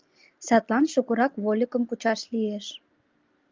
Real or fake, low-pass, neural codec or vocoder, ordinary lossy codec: real; 7.2 kHz; none; Opus, 32 kbps